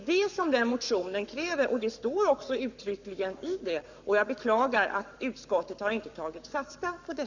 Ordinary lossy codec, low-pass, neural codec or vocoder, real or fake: none; 7.2 kHz; codec, 44.1 kHz, 7.8 kbps, Pupu-Codec; fake